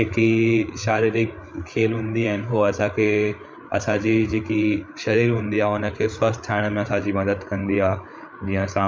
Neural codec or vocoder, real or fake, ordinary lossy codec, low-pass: codec, 16 kHz, 8 kbps, FreqCodec, larger model; fake; none; none